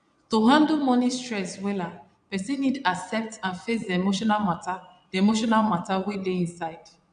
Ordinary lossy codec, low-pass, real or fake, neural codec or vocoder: none; 9.9 kHz; fake; vocoder, 22.05 kHz, 80 mel bands, Vocos